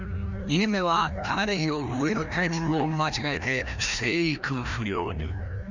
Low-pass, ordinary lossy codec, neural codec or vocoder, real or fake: 7.2 kHz; none; codec, 16 kHz, 1 kbps, FreqCodec, larger model; fake